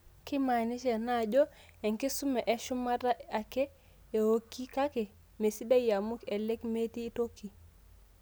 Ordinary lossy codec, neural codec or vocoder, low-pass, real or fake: none; none; none; real